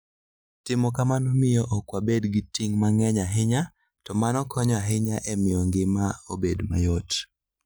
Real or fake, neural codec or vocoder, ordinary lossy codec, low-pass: real; none; none; none